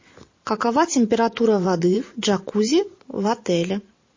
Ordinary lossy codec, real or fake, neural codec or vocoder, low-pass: MP3, 32 kbps; fake; vocoder, 44.1 kHz, 128 mel bands every 512 samples, BigVGAN v2; 7.2 kHz